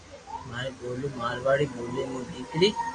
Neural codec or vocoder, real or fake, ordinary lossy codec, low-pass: none; real; AAC, 32 kbps; 9.9 kHz